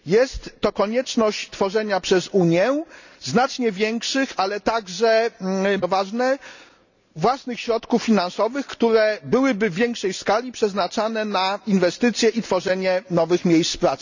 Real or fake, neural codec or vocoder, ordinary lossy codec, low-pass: real; none; none; 7.2 kHz